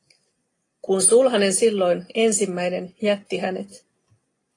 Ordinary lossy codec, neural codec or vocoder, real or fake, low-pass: AAC, 32 kbps; none; real; 10.8 kHz